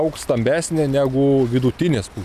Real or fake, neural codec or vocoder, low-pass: real; none; 14.4 kHz